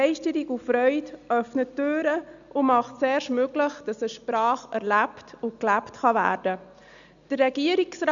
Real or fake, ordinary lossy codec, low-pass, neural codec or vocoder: real; none; 7.2 kHz; none